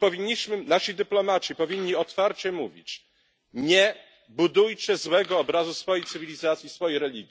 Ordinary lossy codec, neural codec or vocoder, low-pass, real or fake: none; none; none; real